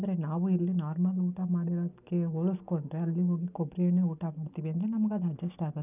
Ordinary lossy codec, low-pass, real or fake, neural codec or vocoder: none; 3.6 kHz; real; none